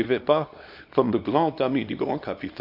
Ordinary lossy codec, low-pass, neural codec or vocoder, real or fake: MP3, 48 kbps; 5.4 kHz; codec, 24 kHz, 0.9 kbps, WavTokenizer, small release; fake